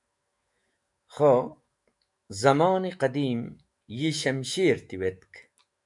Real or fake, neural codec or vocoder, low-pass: fake; autoencoder, 48 kHz, 128 numbers a frame, DAC-VAE, trained on Japanese speech; 10.8 kHz